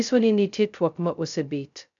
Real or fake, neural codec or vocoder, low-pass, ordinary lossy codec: fake; codec, 16 kHz, 0.2 kbps, FocalCodec; 7.2 kHz; none